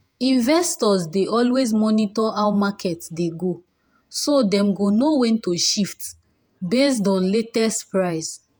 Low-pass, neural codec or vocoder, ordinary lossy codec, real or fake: none; vocoder, 48 kHz, 128 mel bands, Vocos; none; fake